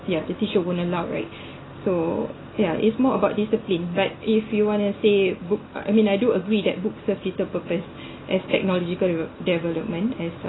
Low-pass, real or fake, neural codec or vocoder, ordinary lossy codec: 7.2 kHz; real; none; AAC, 16 kbps